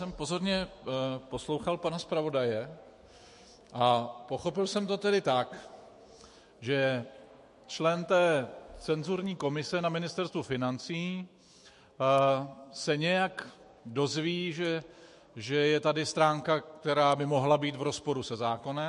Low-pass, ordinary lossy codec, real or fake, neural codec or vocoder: 14.4 kHz; MP3, 48 kbps; fake; autoencoder, 48 kHz, 128 numbers a frame, DAC-VAE, trained on Japanese speech